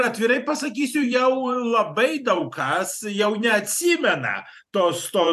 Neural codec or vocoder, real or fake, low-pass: vocoder, 44.1 kHz, 128 mel bands every 512 samples, BigVGAN v2; fake; 14.4 kHz